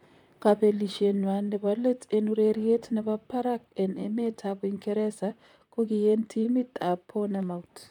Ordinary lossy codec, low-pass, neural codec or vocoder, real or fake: none; 19.8 kHz; vocoder, 44.1 kHz, 128 mel bands, Pupu-Vocoder; fake